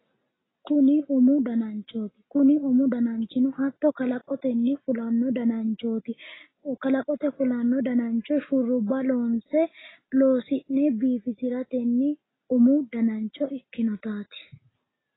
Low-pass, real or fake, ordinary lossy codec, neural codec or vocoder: 7.2 kHz; real; AAC, 16 kbps; none